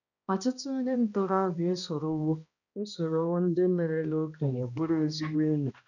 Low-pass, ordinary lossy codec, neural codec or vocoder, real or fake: 7.2 kHz; none; codec, 16 kHz, 1 kbps, X-Codec, HuBERT features, trained on balanced general audio; fake